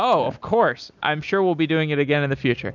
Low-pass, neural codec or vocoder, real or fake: 7.2 kHz; none; real